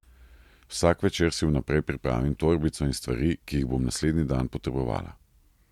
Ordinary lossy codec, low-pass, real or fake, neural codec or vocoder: MP3, 96 kbps; 19.8 kHz; real; none